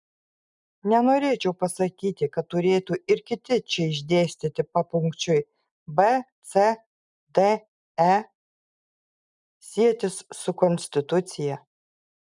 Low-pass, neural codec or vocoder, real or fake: 10.8 kHz; none; real